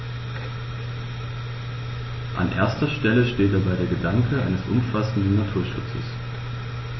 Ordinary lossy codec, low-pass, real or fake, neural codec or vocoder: MP3, 24 kbps; 7.2 kHz; real; none